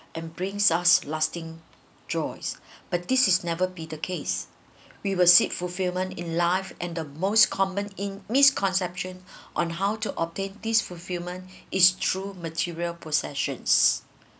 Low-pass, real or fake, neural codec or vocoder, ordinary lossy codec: none; real; none; none